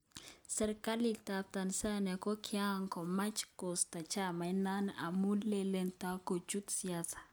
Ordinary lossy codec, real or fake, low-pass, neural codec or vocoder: none; real; none; none